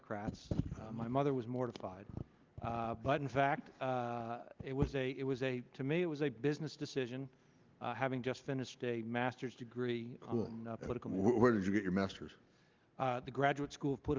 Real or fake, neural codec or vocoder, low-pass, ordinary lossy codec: real; none; 7.2 kHz; Opus, 32 kbps